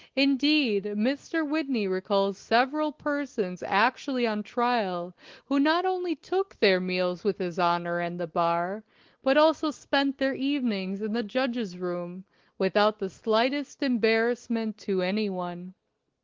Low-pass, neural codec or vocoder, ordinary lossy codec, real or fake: 7.2 kHz; none; Opus, 16 kbps; real